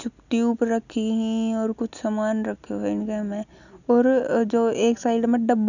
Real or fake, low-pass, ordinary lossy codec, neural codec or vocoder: real; 7.2 kHz; none; none